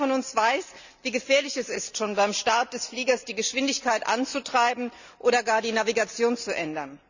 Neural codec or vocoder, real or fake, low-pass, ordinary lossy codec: none; real; 7.2 kHz; none